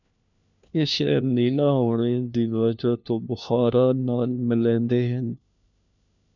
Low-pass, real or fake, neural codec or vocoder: 7.2 kHz; fake; codec, 16 kHz, 1 kbps, FunCodec, trained on LibriTTS, 50 frames a second